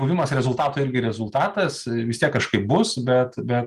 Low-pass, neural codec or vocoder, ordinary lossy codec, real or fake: 14.4 kHz; none; Opus, 32 kbps; real